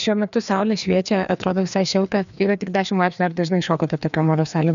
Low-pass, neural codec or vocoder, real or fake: 7.2 kHz; codec, 16 kHz, 2 kbps, FreqCodec, larger model; fake